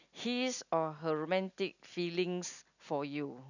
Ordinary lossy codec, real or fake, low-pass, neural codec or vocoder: none; real; 7.2 kHz; none